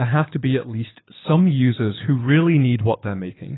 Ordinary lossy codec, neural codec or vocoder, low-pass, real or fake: AAC, 16 kbps; codec, 24 kHz, 6 kbps, HILCodec; 7.2 kHz; fake